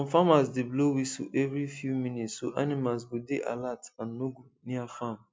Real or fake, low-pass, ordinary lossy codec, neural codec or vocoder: real; none; none; none